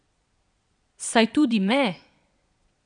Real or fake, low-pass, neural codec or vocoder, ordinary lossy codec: fake; 9.9 kHz; vocoder, 22.05 kHz, 80 mel bands, Vocos; none